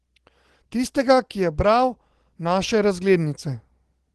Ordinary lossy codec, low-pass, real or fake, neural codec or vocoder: Opus, 16 kbps; 10.8 kHz; real; none